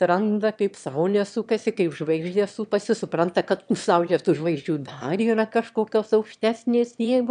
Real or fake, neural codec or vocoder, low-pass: fake; autoencoder, 22.05 kHz, a latent of 192 numbers a frame, VITS, trained on one speaker; 9.9 kHz